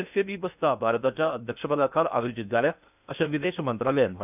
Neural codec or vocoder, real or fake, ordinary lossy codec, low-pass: codec, 16 kHz in and 24 kHz out, 0.6 kbps, FocalCodec, streaming, 2048 codes; fake; none; 3.6 kHz